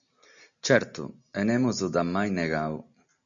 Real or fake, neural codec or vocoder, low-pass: real; none; 7.2 kHz